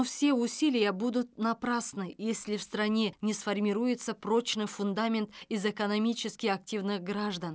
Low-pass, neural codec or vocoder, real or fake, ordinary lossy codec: none; none; real; none